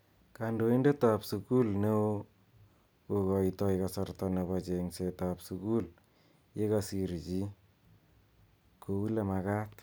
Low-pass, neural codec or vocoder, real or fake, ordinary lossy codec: none; none; real; none